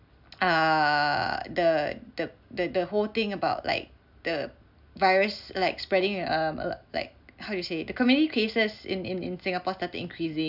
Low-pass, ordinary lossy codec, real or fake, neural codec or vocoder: 5.4 kHz; none; real; none